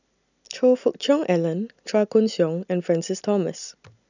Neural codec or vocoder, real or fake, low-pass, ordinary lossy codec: none; real; 7.2 kHz; none